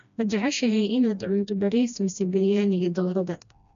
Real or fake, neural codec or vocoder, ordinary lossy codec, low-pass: fake; codec, 16 kHz, 1 kbps, FreqCodec, smaller model; none; 7.2 kHz